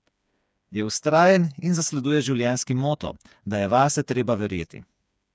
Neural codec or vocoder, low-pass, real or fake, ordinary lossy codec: codec, 16 kHz, 4 kbps, FreqCodec, smaller model; none; fake; none